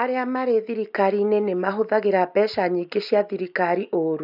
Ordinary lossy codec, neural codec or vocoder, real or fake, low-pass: none; none; real; 5.4 kHz